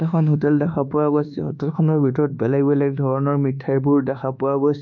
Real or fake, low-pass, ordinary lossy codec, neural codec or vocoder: fake; 7.2 kHz; none; codec, 24 kHz, 1.2 kbps, DualCodec